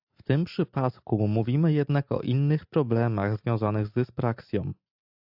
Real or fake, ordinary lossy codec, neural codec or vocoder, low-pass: real; AAC, 48 kbps; none; 5.4 kHz